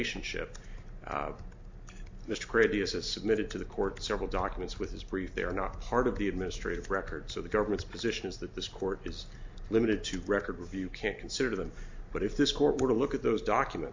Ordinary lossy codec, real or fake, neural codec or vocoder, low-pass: MP3, 48 kbps; real; none; 7.2 kHz